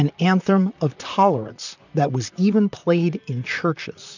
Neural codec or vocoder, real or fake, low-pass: vocoder, 44.1 kHz, 128 mel bands, Pupu-Vocoder; fake; 7.2 kHz